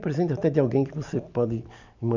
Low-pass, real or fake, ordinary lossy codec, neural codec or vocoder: 7.2 kHz; real; none; none